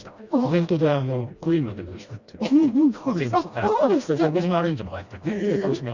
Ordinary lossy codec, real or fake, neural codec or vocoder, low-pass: Opus, 64 kbps; fake; codec, 16 kHz, 1 kbps, FreqCodec, smaller model; 7.2 kHz